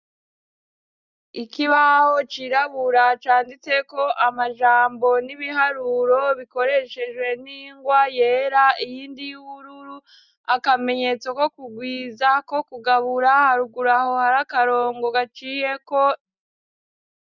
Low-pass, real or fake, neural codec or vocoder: 7.2 kHz; real; none